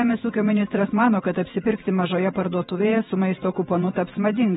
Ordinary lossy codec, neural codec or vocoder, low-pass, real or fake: AAC, 16 kbps; vocoder, 48 kHz, 128 mel bands, Vocos; 19.8 kHz; fake